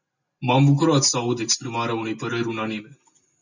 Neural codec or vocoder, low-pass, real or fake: none; 7.2 kHz; real